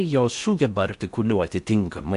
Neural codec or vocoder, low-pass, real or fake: codec, 16 kHz in and 24 kHz out, 0.6 kbps, FocalCodec, streaming, 4096 codes; 10.8 kHz; fake